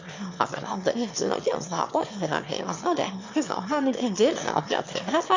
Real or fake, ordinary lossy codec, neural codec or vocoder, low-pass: fake; MP3, 64 kbps; autoencoder, 22.05 kHz, a latent of 192 numbers a frame, VITS, trained on one speaker; 7.2 kHz